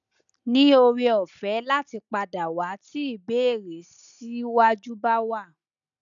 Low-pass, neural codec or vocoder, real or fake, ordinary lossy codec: 7.2 kHz; none; real; none